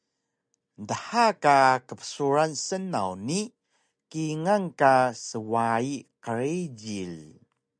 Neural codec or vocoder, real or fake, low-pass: none; real; 9.9 kHz